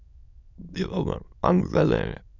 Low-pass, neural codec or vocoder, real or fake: 7.2 kHz; autoencoder, 22.05 kHz, a latent of 192 numbers a frame, VITS, trained on many speakers; fake